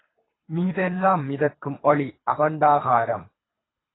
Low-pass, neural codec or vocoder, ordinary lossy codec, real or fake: 7.2 kHz; codec, 24 kHz, 3 kbps, HILCodec; AAC, 16 kbps; fake